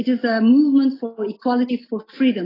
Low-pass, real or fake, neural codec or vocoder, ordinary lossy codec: 5.4 kHz; real; none; AAC, 24 kbps